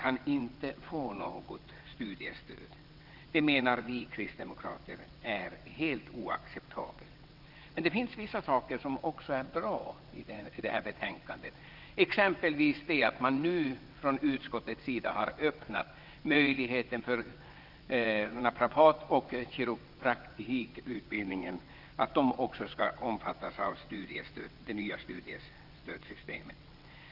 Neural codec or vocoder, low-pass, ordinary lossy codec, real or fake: vocoder, 22.05 kHz, 80 mel bands, WaveNeXt; 5.4 kHz; Opus, 24 kbps; fake